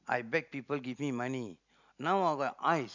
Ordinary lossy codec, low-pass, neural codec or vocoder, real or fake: none; 7.2 kHz; none; real